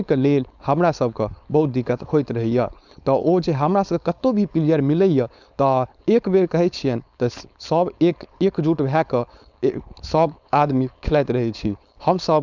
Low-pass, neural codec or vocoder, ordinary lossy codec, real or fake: 7.2 kHz; codec, 16 kHz, 4.8 kbps, FACodec; none; fake